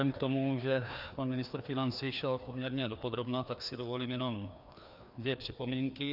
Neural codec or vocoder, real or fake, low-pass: codec, 16 kHz, 2 kbps, FreqCodec, larger model; fake; 5.4 kHz